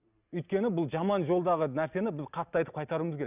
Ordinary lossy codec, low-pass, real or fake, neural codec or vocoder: none; 3.6 kHz; real; none